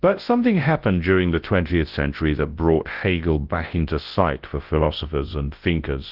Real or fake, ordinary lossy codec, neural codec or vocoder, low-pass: fake; Opus, 16 kbps; codec, 24 kHz, 0.9 kbps, WavTokenizer, large speech release; 5.4 kHz